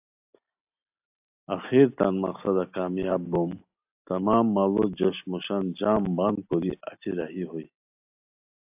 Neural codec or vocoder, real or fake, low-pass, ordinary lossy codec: none; real; 3.6 kHz; AAC, 32 kbps